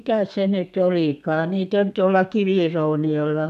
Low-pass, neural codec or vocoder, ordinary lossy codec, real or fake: 14.4 kHz; codec, 32 kHz, 1.9 kbps, SNAC; none; fake